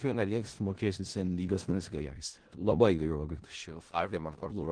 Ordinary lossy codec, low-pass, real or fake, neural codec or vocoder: Opus, 16 kbps; 9.9 kHz; fake; codec, 16 kHz in and 24 kHz out, 0.4 kbps, LongCat-Audio-Codec, four codebook decoder